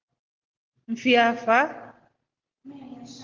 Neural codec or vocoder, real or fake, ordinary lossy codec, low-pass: none; real; Opus, 16 kbps; 7.2 kHz